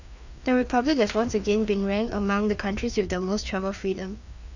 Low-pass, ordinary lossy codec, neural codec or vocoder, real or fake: 7.2 kHz; none; codec, 16 kHz, 2 kbps, FreqCodec, larger model; fake